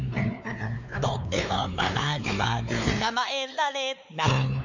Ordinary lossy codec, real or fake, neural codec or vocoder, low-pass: none; fake; codec, 16 kHz, 4 kbps, X-Codec, WavLM features, trained on Multilingual LibriSpeech; 7.2 kHz